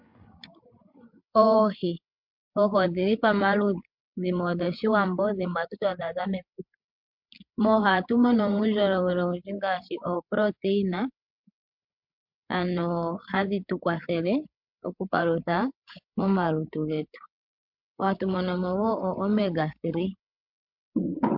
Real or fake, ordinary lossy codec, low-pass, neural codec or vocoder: fake; MP3, 48 kbps; 5.4 kHz; codec, 16 kHz, 8 kbps, FreqCodec, larger model